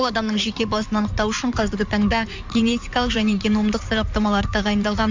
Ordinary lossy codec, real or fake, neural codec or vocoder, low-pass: MP3, 64 kbps; fake; codec, 16 kHz in and 24 kHz out, 2.2 kbps, FireRedTTS-2 codec; 7.2 kHz